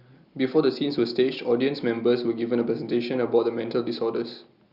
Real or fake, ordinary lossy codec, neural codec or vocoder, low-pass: real; Opus, 64 kbps; none; 5.4 kHz